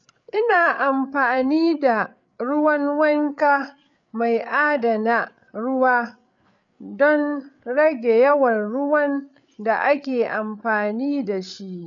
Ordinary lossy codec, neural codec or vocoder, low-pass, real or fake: none; codec, 16 kHz, 8 kbps, FreqCodec, larger model; 7.2 kHz; fake